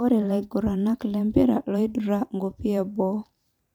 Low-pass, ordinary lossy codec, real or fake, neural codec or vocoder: 19.8 kHz; none; fake; vocoder, 44.1 kHz, 128 mel bands every 512 samples, BigVGAN v2